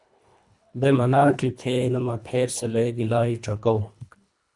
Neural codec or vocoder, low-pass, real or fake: codec, 24 kHz, 1.5 kbps, HILCodec; 10.8 kHz; fake